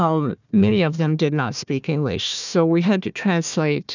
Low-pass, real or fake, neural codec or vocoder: 7.2 kHz; fake; codec, 16 kHz, 1 kbps, FunCodec, trained on Chinese and English, 50 frames a second